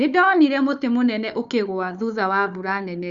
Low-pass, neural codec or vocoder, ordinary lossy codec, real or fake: 7.2 kHz; codec, 16 kHz, 4 kbps, FunCodec, trained on Chinese and English, 50 frames a second; Opus, 64 kbps; fake